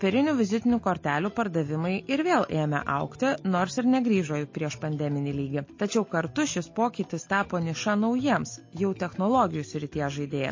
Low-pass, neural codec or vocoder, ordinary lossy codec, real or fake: 7.2 kHz; none; MP3, 32 kbps; real